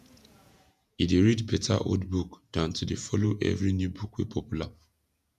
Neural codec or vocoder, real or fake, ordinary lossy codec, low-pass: vocoder, 48 kHz, 128 mel bands, Vocos; fake; none; 14.4 kHz